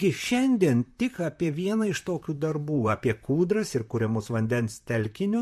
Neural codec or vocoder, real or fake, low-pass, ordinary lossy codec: vocoder, 44.1 kHz, 128 mel bands, Pupu-Vocoder; fake; 14.4 kHz; MP3, 64 kbps